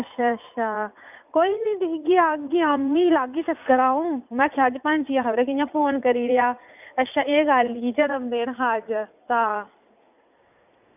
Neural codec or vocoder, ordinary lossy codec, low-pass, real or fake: vocoder, 44.1 kHz, 80 mel bands, Vocos; none; 3.6 kHz; fake